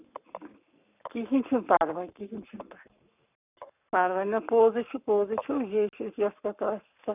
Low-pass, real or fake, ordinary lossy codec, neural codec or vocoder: 3.6 kHz; fake; none; codec, 44.1 kHz, 7.8 kbps, Pupu-Codec